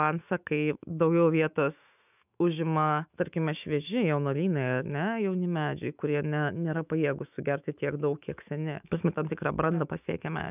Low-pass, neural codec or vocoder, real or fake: 3.6 kHz; autoencoder, 48 kHz, 128 numbers a frame, DAC-VAE, trained on Japanese speech; fake